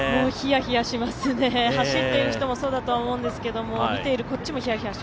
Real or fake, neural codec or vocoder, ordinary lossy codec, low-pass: real; none; none; none